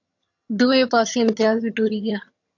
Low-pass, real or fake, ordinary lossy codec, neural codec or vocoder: 7.2 kHz; fake; AAC, 48 kbps; vocoder, 22.05 kHz, 80 mel bands, HiFi-GAN